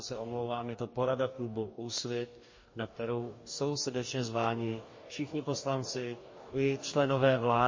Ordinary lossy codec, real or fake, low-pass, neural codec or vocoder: MP3, 32 kbps; fake; 7.2 kHz; codec, 44.1 kHz, 2.6 kbps, DAC